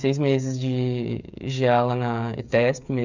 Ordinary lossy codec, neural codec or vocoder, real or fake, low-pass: none; codec, 16 kHz, 8 kbps, FreqCodec, smaller model; fake; 7.2 kHz